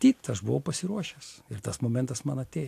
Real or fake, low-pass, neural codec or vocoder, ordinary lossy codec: real; 14.4 kHz; none; AAC, 64 kbps